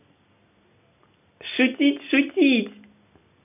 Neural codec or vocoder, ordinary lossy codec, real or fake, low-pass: none; none; real; 3.6 kHz